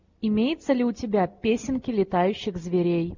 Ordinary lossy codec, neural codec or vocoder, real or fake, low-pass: MP3, 64 kbps; none; real; 7.2 kHz